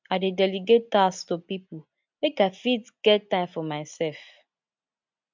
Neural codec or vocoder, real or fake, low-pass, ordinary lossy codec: none; real; 7.2 kHz; MP3, 64 kbps